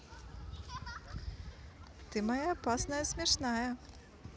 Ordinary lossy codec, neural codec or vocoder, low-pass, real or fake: none; none; none; real